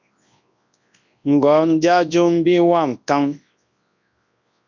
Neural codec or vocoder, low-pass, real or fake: codec, 24 kHz, 0.9 kbps, WavTokenizer, large speech release; 7.2 kHz; fake